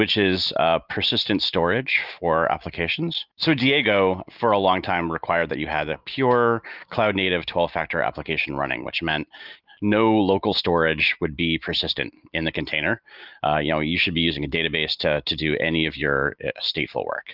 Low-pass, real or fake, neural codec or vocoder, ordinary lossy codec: 5.4 kHz; real; none; Opus, 24 kbps